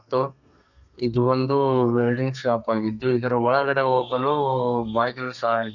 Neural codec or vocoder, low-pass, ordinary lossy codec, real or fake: codec, 32 kHz, 1.9 kbps, SNAC; 7.2 kHz; none; fake